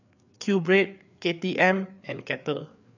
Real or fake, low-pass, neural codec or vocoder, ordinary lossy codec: fake; 7.2 kHz; codec, 16 kHz, 4 kbps, FreqCodec, larger model; none